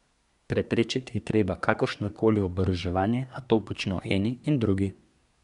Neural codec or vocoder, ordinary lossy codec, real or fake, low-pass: codec, 24 kHz, 1 kbps, SNAC; none; fake; 10.8 kHz